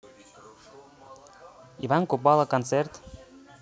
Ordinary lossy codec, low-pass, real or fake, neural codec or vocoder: none; none; real; none